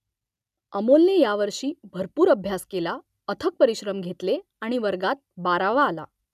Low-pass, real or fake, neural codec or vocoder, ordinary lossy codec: 14.4 kHz; real; none; none